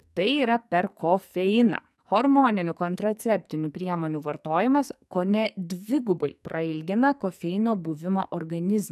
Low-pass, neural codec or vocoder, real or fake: 14.4 kHz; codec, 44.1 kHz, 2.6 kbps, SNAC; fake